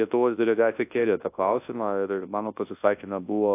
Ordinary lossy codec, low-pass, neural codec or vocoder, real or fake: AAC, 24 kbps; 3.6 kHz; codec, 24 kHz, 0.9 kbps, WavTokenizer, large speech release; fake